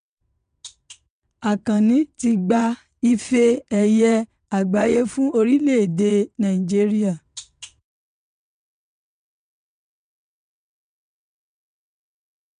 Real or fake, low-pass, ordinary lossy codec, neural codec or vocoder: fake; 9.9 kHz; none; vocoder, 22.05 kHz, 80 mel bands, WaveNeXt